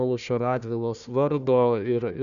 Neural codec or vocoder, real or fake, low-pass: codec, 16 kHz, 1 kbps, FunCodec, trained on Chinese and English, 50 frames a second; fake; 7.2 kHz